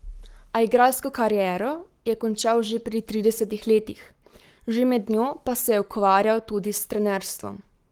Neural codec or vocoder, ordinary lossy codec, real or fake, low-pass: codec, 44.1 kHz, 7.8 kbps, Pupu-Codec; Opus, 24 kbps; fake; 19.8 kHz